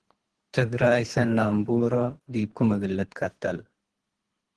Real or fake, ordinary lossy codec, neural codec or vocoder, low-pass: fake; Opus, 16 kbps; codec, 24 kHz, 3 kbps, HILCodec; 10.8 kHz